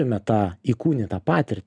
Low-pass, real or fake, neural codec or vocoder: 9.9 kHz; real; none